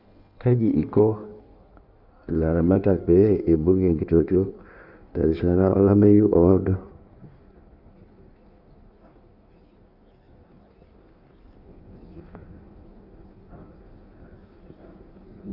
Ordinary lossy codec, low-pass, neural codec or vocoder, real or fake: none; 5.4 kHz; codec, 16 kHz in and 24 kHz out, 1.1 kbps, FireRedTTS-2 codec; fake